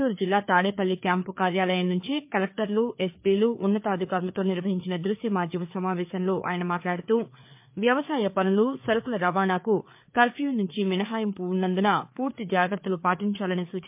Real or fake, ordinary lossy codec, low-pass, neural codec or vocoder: fake; MP3, 32 kbps; 3.6 kHz; codec, 16 kHz, 4 kbps, FreqCodec, larger model